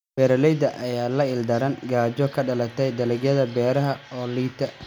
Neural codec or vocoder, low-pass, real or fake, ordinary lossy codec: none; 19.8 kHz; real; none